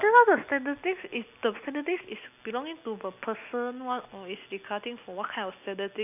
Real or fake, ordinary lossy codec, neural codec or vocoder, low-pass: real; none; none; 3.6 kHz